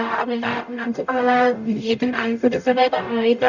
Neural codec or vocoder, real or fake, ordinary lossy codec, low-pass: codec, 44.1 kHz, 0.9 kbps, DAC; fake; none; 7.2 kHz